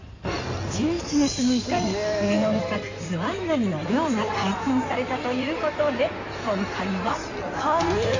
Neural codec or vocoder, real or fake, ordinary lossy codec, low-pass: codec, 16 kHz in and 24 kHz out, 2.2 kbps, FireRedTTS-2 codec; fake; AAC, 48 kbps; 7.2 kHz